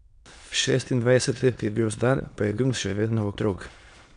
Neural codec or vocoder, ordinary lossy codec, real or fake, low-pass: autoencoder, 22.05 kHz, a latent of 192 numbers a frame, VITS, trained on many speakers; none; fake; 9.9 kHz